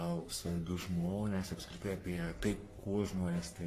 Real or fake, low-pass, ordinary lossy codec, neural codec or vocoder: fake; 14.4 kHz; AAC, 48 kbps; codec, 44.1 kHz, 3.4 kbps, Pupu-Codec